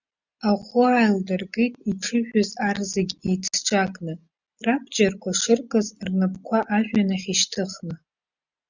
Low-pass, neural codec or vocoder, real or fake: 7.2 kHz; none; real